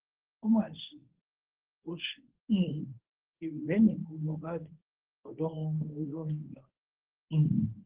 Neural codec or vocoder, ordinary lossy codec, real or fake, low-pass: codec, 24 kHz, 0.9 kbps, WavTokenizer, medium speech release version 1; Opus, 16 kbps; fake; 3.6 kHz